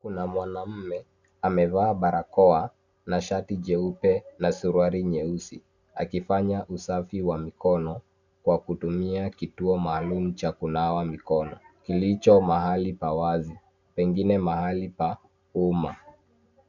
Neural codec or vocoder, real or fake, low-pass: none; real; 7.2 kHz